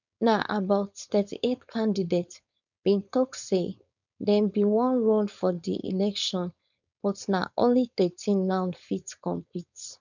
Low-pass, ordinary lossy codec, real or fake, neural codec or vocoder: 7.2 kHz; none; fake; codec, 16 kHz, 4.8 kbps, FACodec